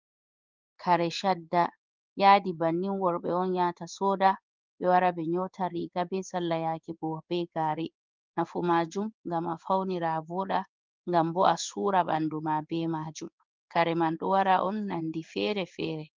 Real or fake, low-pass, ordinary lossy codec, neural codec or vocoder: fake; 7.2 kHz; Opus, 24 kbps; codec, 16 kHz in and 24 kHz out, 1 kbps, XY-Tokenizer